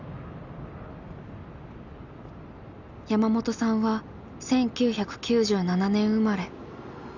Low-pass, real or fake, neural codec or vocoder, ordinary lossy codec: 7.2 kHz; real; none; none